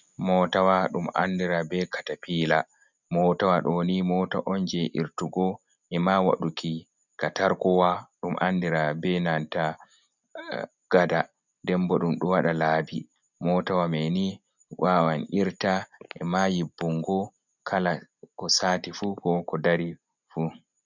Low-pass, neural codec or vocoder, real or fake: 7.2 kHz; none; real